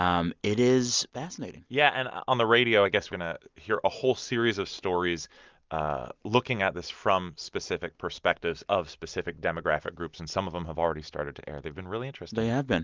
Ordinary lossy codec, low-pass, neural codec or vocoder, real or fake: Opus, 32 kbps; 7.2 kHz; none; real